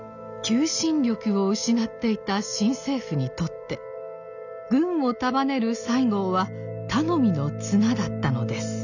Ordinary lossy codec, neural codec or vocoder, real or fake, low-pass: none; none; real; 7.2 kHz